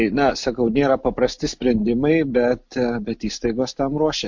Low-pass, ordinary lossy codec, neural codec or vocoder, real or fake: 7.2 kHz; MP3, 48 kbps; none; real